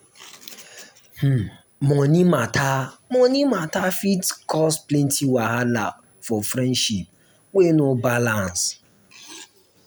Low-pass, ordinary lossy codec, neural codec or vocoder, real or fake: none; none; none; real